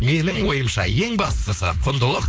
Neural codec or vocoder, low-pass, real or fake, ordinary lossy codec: codec, 16 kHz, 4.8 kbps, FACodec; none; fake; none